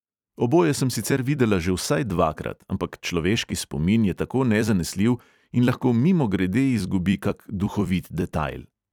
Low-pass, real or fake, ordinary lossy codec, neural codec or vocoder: 19.8 kHz; fake; none; vocoder, 44.1 kHz, 128 mel bands every 256 samples, BigVGAN v2